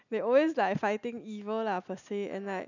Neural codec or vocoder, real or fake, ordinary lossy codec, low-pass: none; real; none; 7.2 kHz